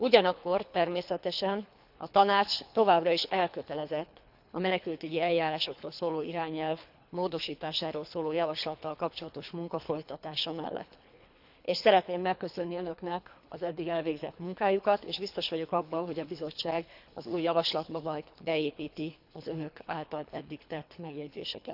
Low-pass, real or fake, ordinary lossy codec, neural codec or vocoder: 5.4 kHz; fake; none; codec, 24 kHz, 3 kbps, HILCodec